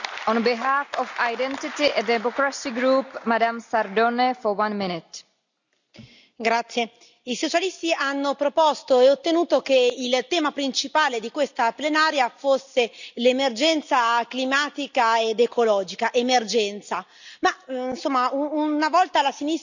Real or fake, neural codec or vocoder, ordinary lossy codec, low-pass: real; none; none; 7.2 kHz